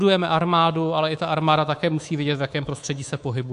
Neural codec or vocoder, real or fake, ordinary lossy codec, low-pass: codec, 24 kHz, 3.1 kbps, DualCodec; fake; AAC, 64 kbps; 10.8 kHz